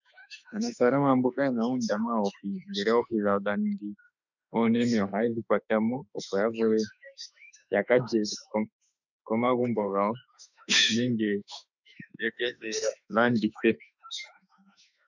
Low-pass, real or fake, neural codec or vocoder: 7.2 kHz; fake; autoencoder, 48 kHz, 32 numbers a frame, DAC-VAE, trained on Japanese speech